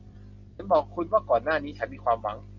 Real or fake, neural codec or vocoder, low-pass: real; none; 7.2 kHz